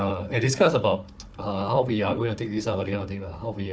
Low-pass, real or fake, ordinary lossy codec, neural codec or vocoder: none; fake; none; codec, 16 kHz, 4 kbps, FunCodec, trained on Chinese and English, 50 frames a second